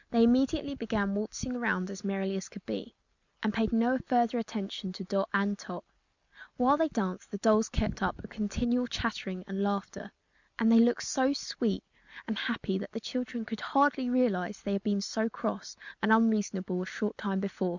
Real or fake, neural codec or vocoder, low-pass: real; none; 7.2 kHz